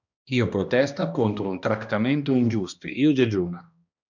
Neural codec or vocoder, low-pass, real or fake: codec, 16 kHz, 1 kbps, X-Codec, HuBERT features, trained on balanced general audio; 7.2 kHz; fake